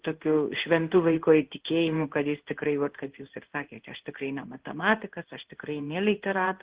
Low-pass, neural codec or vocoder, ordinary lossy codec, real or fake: 3.6 kHz; codec, 16 kHz in and 24 kHz out, 1 kbps, XY-Tokenizer; Opus, 16 kbps; fake